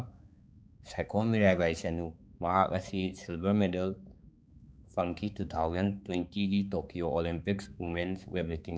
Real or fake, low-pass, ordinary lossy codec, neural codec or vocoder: fake; none; none; codec, 16 kHz, 4 kbps, X-Codec, HuBERT features, trained on balanced general audio